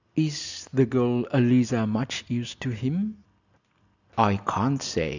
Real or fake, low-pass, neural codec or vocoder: real; 7.2 kHz; none